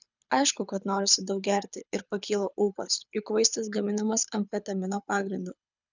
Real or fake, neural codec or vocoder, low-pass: fake; codec, 24 kHz, 6 kbps, HILCodec; 7.2 kHz